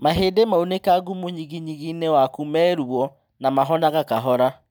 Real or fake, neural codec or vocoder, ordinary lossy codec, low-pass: real; none; none; none